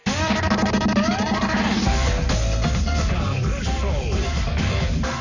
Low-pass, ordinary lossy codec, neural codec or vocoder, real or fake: 7.2 kHz; none; codec, 44.1 kHz, 7.8 kbps, Pupu-Codec; fake